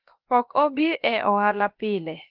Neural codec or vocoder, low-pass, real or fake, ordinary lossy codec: codec, 16 kHz, about 1 kbps, DyCAST, with the encoder's durations; 5.4 kHz; fake; Opus, 32 kbps